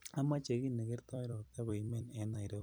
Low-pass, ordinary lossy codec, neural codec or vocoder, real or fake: none; none; vocoder, 44.1 kHz, 128 mel bands every 256 samples, BigVGAN v2; fake